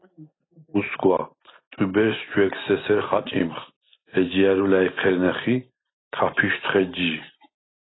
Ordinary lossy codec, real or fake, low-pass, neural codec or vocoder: AAC, 16 kbps; real; 7.2 kHz; none